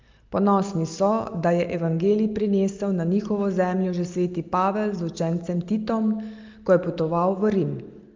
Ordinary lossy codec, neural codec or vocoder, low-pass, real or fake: Opus, 24 kbps; none; 7.2 kHz; real